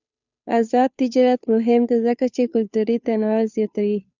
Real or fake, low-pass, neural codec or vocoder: fake; 7.2 kHz; codec, 16 kHz, 2 kbps, FunCodec, trained on Chinese and English, 25 frames a second